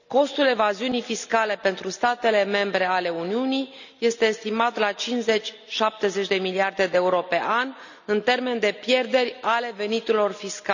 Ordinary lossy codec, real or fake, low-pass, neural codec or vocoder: none; real; 7.2 kHz; none